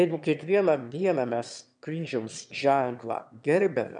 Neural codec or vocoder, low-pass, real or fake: autoencoder, 22.05 kHz, a latent of 192 numbers a frame, VITS, trained on one speaker; 9.9 kHz; fake